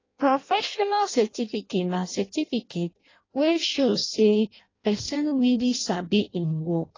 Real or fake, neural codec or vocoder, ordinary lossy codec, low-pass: fake; codec, 16 kHz in and 24 kHz out, 0.6 kbps, FireRedTTS-2 codec; AAC, 32 kbps; 7.2 kHz